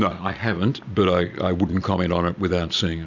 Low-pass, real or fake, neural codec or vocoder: 7.2 kHz; real; none